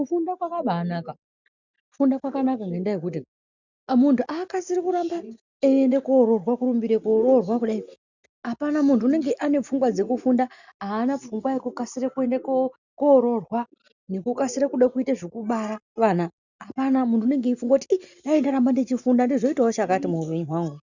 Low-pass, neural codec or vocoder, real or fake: 7.2 kHz; none; real